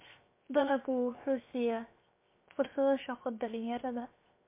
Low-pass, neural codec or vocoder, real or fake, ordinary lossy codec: 3.6 kHz; codec, 16 kHz, 0.7 kbps, FocalCodec; fake; MP3, 24 kbps